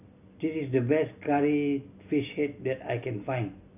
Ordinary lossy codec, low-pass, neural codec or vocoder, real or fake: none; 3.6 kHz; none; real